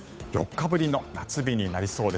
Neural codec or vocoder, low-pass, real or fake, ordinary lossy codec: codec, 16 kHz, 8 kbps, FunCodec, trained on Chinese and English, 25 frames a second; none; fake; none